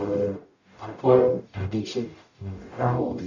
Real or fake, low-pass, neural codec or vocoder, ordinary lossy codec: fake; 7.2 kHz; codec, 44.1 kHz, 0.9 kbps, DAC; none